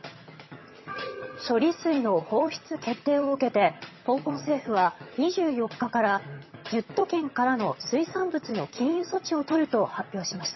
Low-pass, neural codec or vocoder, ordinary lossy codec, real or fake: 7.2 kHz; vocoder, 22.05 kHz, 80 mel bands, HiFi-GAN; MP3, 24 kbps; fake